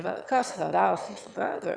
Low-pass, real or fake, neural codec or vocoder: 9.9 kHz; fake; autoencoder, 22.05 kHz, a latent of 192 numbers a frame, VITS, trained on one speaker